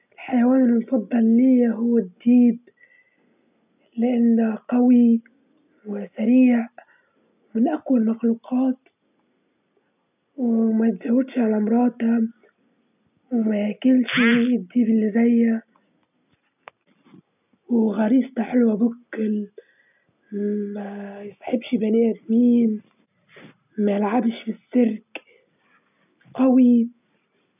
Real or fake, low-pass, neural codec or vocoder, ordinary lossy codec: real; 3.6 kHz; none; none